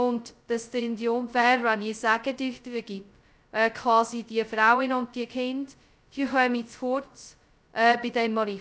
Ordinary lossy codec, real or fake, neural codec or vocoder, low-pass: none; fake; codec, 16 kHz, 0.2 kbps, FocalCodec; none